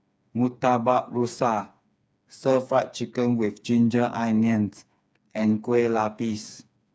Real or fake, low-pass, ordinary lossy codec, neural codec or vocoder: fake; none; none; codec, 16 kHz, 4 kbps, FreqCodec, smaller model